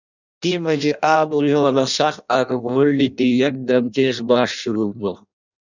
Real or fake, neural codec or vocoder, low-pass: fake; codec, 16 kHz in and 24 kHz out, 0.6 kbps, FireRedTTS-2 codec; 7.2 kHz